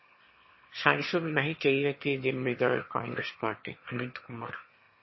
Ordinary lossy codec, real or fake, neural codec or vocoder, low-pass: MP3, 24 kbps; fake; autoencoder, 22.05 kHz, a latent of 192 numbers a frame, VITS, trained on one speaker; 7.2 kHz